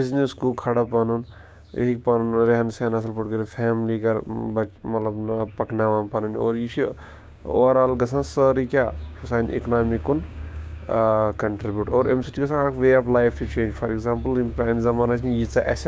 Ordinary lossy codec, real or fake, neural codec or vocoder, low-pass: none; fake; codec, 16 kHz, 6 kbps, DAC; none